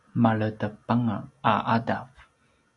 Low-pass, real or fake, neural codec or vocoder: 10.8 kHz; real; none